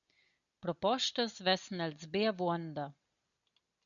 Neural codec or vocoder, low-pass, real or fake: none; 7.2 kHz; real